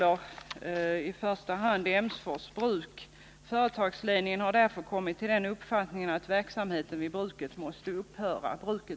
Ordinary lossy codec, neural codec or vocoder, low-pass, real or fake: none; none; none; real